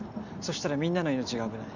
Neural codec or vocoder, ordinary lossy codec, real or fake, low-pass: none; none; real; 7.2 kHz